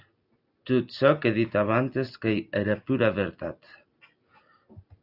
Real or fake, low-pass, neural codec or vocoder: real; 5.4 kHz; none